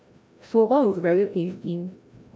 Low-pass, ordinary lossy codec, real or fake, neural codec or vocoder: none; none; fake; codec, 16 kHz, 0.5 kbps, FreqCodec, larger model